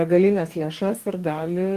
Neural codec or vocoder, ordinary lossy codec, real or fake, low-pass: codec, 44.1 kHz, 2.6 kbps, DAC; Opus, 24 kbps; fake; 14.4 kHz